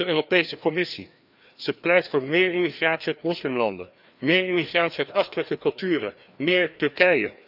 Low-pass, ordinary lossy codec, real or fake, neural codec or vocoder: 5.4 kHz; none; fake; codec, 16 kHz, 2 kbps, FreqCodec, larger model